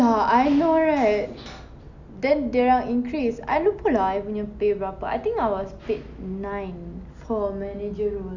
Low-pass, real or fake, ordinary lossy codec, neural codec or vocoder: 7.2 kHz; real; none; none